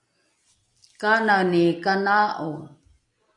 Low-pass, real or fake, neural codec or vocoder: 10.8 kHz; real; none